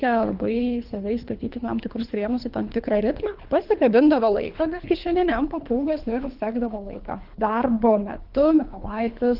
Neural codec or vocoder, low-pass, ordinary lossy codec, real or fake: codec, 24 kHz, 3 kbps, HILCodec; 5.4 kHz; Opus, 32 kbps; fake